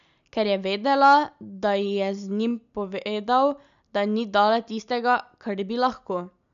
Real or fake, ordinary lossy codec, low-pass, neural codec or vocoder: real; MP3, 96 kbps; 7.2 kHz; none